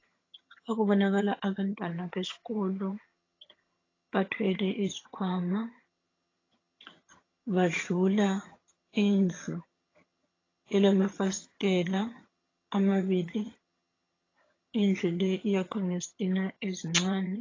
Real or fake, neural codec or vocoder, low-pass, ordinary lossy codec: fake; vocoder, 22.05 kHz, 80 mel bands, HiFi-GAN; 7.2 kHz; AAC, 32 kbps